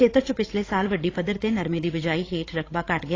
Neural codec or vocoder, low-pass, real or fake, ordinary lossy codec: codec, 16 kHz, 16 kbps, FreqCodec, larger model; 7.2 kHz; fake; AAC, 32 kbps